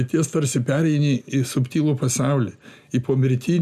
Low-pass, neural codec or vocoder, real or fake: 14.4 kHz; none; real